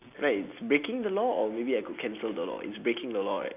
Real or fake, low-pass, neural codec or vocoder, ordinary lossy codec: real; 3.6 kHz; none; AAC, 32 kbps